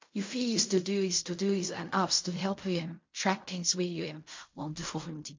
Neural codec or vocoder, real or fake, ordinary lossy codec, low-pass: codec, 16 kHz in and 24 kHz out, 0.4 kbps, LongCat-Audio-Codec, fine tuned four codebook decoder; fake; MP3, 48 kbps; 7.2 kHz